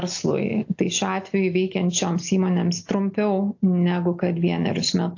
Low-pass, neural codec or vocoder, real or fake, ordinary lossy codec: 7.2 kHz; none; real; AAC, 48 kbps